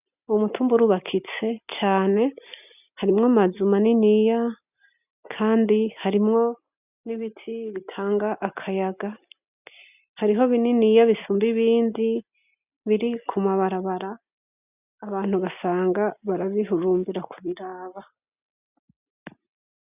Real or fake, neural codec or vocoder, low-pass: real; none; 3.6 kHz